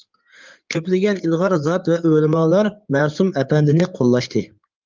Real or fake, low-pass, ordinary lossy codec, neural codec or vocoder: fake; 7.2 kHz; Opus, 24 kbps; codec, 16 kHz in and 24 kHz out, 2.2 kbps, FireRedTTS-2 codec